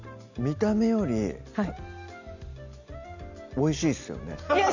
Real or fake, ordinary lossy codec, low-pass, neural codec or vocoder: real; none; 7.2 kHz; none